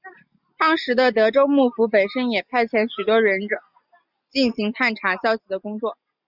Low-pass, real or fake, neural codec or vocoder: 5.4 kHz; real; none